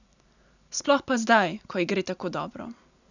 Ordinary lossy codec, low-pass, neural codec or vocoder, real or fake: none; 7.2 kHz; none; real